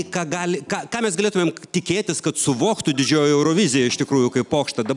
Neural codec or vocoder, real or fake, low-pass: none; real; 10.8 kHz